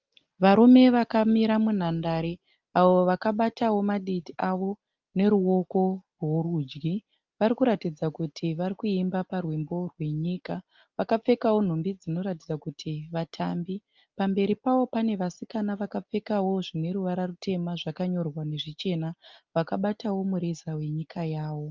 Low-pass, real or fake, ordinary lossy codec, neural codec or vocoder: 7.2 kHz; real; Opus, 24 kbps; none